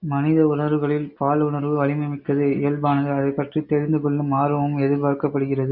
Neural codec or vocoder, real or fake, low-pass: none; real; 5.4 kHz